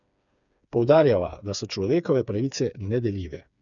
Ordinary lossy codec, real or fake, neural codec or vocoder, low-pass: none; fake; codec, 16 kHz, 4 kbps, FreqCodec, smaller model; 7.2 kHz